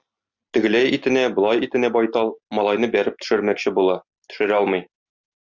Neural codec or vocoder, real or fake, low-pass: none; real; 7.2 kHz